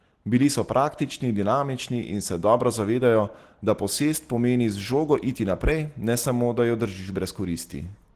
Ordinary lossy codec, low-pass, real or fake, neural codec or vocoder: Opus, 16 kbps; 14.4 kHz; real; none